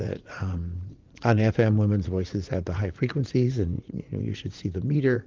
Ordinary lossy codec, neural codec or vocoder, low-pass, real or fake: Opus, 32 kbps; vocoder, 44.1 kHz, 80 mel bands, Vocos; 7.2 kHz; fake